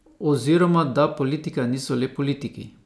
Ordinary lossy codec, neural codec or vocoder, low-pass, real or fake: none; none; none; real